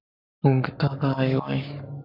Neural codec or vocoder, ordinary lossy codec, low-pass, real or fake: codec, 44.1 kHz, 7.8 kbps, Pupu-Codec; AAC, 32 kbps; 5.4 kHz; fake